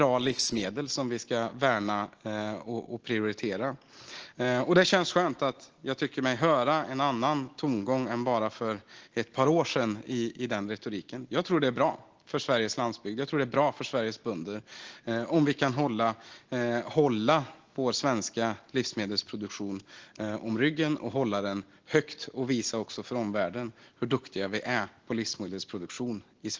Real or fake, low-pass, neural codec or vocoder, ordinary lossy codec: real; 7.2 kHz; none; Opus, 16 kbps